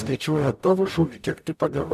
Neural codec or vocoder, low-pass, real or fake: codec, 44.1 kHz, 0.9 kbps, DAC; 14.4 kHz; fake